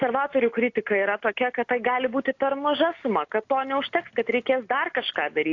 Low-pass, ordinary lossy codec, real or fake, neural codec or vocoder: 7.2 kHz; AAC, 48 kbps; real; none